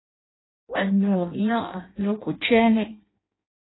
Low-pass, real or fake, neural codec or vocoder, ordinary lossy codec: 7.2 kHz; fake; codec, 16 kHz in and 24 kHz out, 0.6 kbps, FireRedTTS-2 codec; AAC, 16 kbps